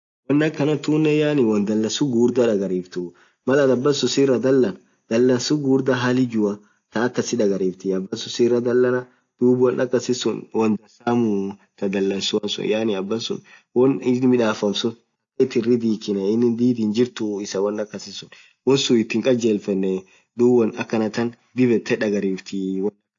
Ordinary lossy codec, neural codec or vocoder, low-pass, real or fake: AAC, 48 kbps; none; 7.2 kHz; real